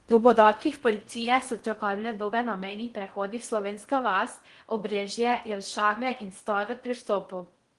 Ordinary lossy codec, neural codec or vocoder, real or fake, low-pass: Opus, 24 kbps; codec, 16 kHz in and 24 kHz out, 0.6 kbps, FocalCodec, streaming, 4096 codes; fake; 10.8 kHz